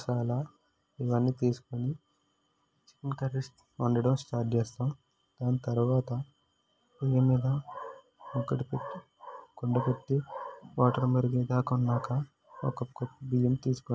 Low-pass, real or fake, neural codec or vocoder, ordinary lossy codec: none; real; none; none